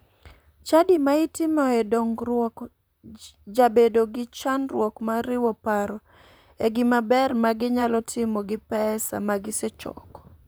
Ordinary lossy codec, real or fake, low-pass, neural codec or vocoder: none; fake; none; vocoder, 44.1 kHz, 128 mel bands, Pupu-Vocoder